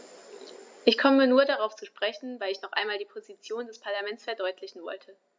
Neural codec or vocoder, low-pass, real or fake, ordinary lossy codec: none; none; real; none